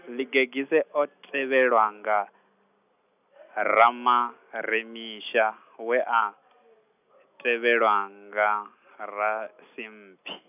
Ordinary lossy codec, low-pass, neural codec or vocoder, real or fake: none; 3.6 kHz; none; real